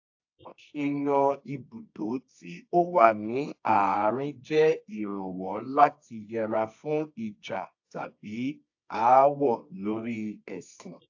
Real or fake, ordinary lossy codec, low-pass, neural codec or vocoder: fake; AAC, 48 kbps; 7.2 kHz; codec, 24 kHz, 0.9 kbps, WavTokenizer, medium music audio release